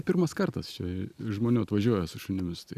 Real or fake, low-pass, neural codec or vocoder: real; 14.4 kHz; none